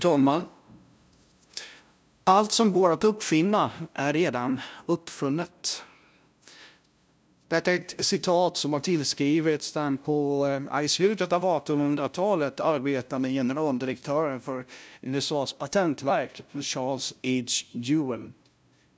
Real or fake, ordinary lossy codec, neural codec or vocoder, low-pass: fake; none; codec, 16 kHz, 0.5 kbps, FunCodec, trained on LibriTTS, 25 frames a second; none